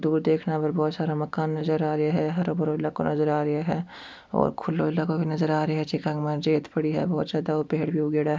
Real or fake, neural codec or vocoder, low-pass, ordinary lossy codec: real; none; none; none